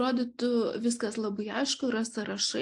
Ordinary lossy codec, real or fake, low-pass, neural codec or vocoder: AAC, 64 kbps; real; 10.8 kHz; none